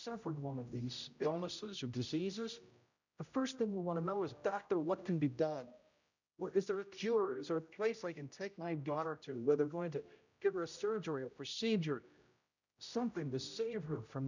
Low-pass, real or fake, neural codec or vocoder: 7.2 kHz; fake; codec, 16 kHz, 0.5 kbps, X-Codec, HuBERT features, trained on general audio